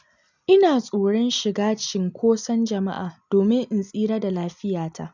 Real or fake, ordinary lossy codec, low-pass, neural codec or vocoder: real; none; 7.2 kHz; none